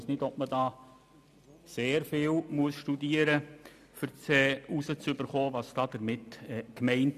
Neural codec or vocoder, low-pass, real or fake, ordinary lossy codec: none; 14.4 kHz; real; none